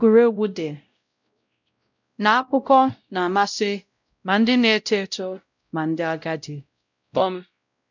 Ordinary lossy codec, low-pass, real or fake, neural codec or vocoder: none; 7.2 kHz; fake; codec, 16 kHz, 0.5 kbps, X-Codec, WavLM features, trained on Multilingual LibriSpeech